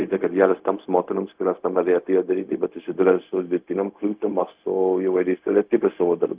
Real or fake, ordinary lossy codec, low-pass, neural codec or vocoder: fake; Opus, 32 kbps; 3.6 kHz; codec, 16 kHz, 0.4 kbps, LongCat-Audio-Codec